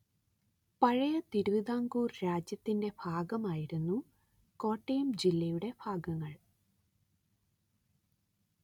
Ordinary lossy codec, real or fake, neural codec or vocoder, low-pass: none; real; none; 19.8 kHz